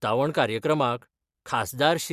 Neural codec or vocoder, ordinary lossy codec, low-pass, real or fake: none; Opus, 64 kbps; 14.4 kHz; real